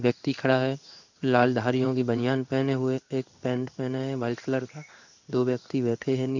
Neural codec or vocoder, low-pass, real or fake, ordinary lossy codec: codec, 16 kHz in and 24 kHz out, 1 kbps, XY-Tokenizer; 7.2 kHz; fake; none